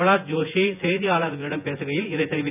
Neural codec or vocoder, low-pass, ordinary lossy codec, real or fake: vocoder, 24 kHz, 100 mel bands, Vocos; 3.6 kHz; none; fake